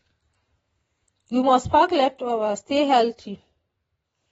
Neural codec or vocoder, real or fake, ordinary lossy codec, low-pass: vocoder, 44.1 kHz, 128 mel bands every 512 samples, BigVGAN v2; fake; AAC, 24 kbps; 19.8 kHz